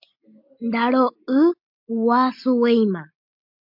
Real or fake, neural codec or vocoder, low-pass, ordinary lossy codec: real; none; 5.4 kHz; MP3, 48 kbps